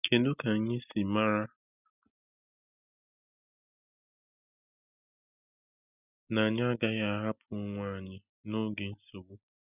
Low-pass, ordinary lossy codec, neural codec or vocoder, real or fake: 3.6 kHz; none; none; real